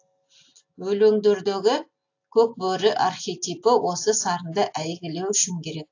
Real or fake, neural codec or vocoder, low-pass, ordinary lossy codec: real; none; 7.2 kHz; AAC, 48 kbps